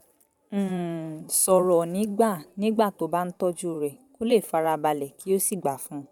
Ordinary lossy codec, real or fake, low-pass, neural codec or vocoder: none; fake; 19.8 kHz; vocoder, 44.1 kHz, 128 mel bands every 512 samples, BigVGAN v2